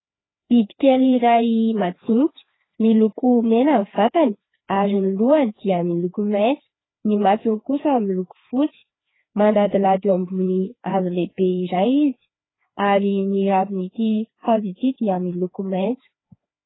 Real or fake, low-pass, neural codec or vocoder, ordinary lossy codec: fake; 7.2 kHz; codec, 16 kHz, 2 kbps, FreqCodec, larger model; AAC, 16 kbps